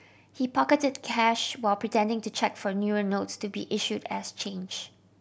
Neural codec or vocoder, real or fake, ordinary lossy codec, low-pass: none; real; none; none